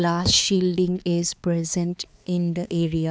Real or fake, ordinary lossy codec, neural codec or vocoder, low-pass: fake; none; codec, 16 kHz, 4 kbps, X-Codec, HuBERT features, trained on balanced general audio; none